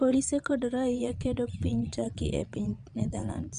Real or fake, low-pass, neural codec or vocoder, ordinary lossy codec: fake; 9.9 kHz; vocoder, 22.05 kHz, 80 mel bands, Vocos; MP3, 96 kbps